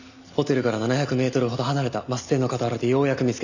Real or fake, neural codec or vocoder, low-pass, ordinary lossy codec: real; none; 7.2 kHz; none